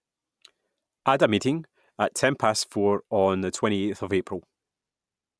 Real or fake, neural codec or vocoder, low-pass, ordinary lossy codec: real; none; none; none